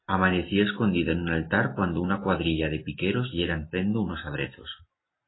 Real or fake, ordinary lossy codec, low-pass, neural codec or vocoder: real; AAC, 16 kbps; 7.2 kHz; none